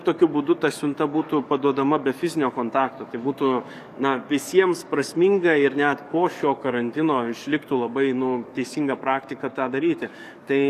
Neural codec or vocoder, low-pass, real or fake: codec, 44.1 kHz, 7.8 kbps, DAC; 14.4 kHz; fake